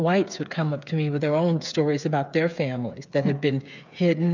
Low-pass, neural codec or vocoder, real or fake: 7.2 kHz; codec, 16 kHz, 8 kbps, FreqCodec, smaller model; fake